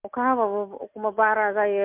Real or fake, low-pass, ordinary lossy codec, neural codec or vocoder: real; 3.6 kHz; MP3, 32 kbps; none